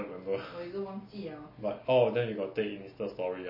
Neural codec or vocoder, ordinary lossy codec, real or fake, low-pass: none; MP3, 24 kbps; real; 5.4 kHz